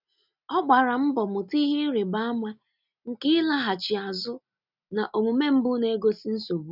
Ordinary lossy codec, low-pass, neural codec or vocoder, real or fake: none; 5.4 kHz; none; real